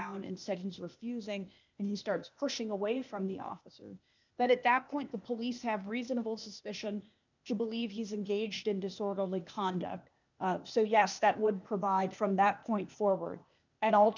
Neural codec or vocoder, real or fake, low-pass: codec, 16 kHz, 0.8 kbps, ZipCodec; fake; 7.2 kHz